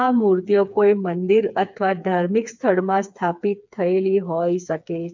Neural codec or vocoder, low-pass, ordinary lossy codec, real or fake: codec, 16 kHz, 4 kbps, FreqCodec, smaller model; 7.2 kHz; MP3, 64 kbps; fake